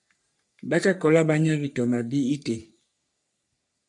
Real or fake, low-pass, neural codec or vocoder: fake; 10.8 kHz; codec, 44.1 kHz, 3.4 kbps, Pupu-Codec